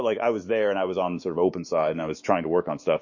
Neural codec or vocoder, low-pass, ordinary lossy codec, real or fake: autoencoder, 48 kHz, 128 numbers a frame, DAC-VAE, trained on Japanese speech; 7.2 kHz; MP3, 32 kbps; fake